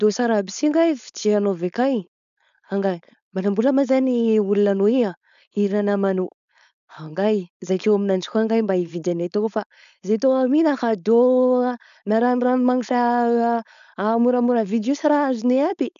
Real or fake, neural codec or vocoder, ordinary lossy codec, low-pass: fake; codec, 16 kHz, 4.8 kbps, FACodec; none; 7.2 kHz